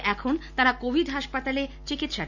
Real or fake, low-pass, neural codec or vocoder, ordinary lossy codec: real; 7.2 kHz; none; none